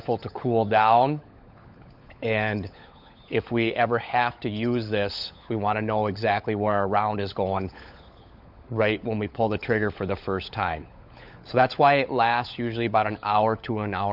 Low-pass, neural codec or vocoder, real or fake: 5.4 kHz; codec, 16 kHz, 16 kbps, FunCodec, trained on LibriTTS, 50 frames a second; fake